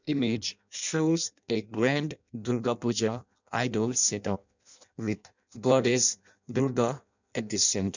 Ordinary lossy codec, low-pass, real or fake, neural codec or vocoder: none; 7.2 kHz; fake; codec, 16 kHz in and 24 kHz out, 0.6 kbps, FireRedTTS-2 codec